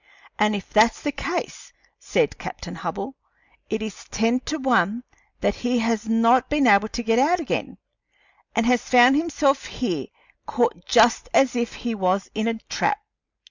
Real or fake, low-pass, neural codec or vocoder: real; 7.2 kHz; none